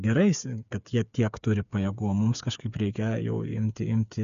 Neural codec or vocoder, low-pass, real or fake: codec, 16 kHz, 8 kbps, FreqCodec, smaller model; 7.2 kHz; fake